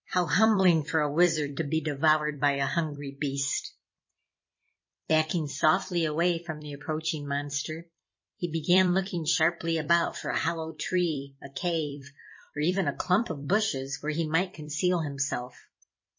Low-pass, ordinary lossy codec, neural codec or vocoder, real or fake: 7.2 kHz; MP3, 32 kbps; vocoder, 44.1 kHz, 128 mel bands every 256 samples, BigVGAN v2; fake